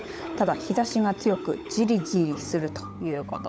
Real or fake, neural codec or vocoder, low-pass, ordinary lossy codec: fake; codec, 16 kHz, 16 kbps, FunCodec, trained on LibriTTS, 50 frames a second; none; none